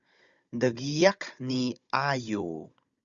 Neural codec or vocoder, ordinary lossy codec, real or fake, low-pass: codec, 16 kHz, 16 kbps, FunCodec, trained on Chinese and English, 50 frames a second; Opus, 64 kbps; fake; 7.2 kHz